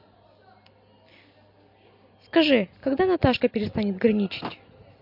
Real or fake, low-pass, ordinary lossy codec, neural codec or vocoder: real; 5.4 kHz; MP3, 48 kbps; none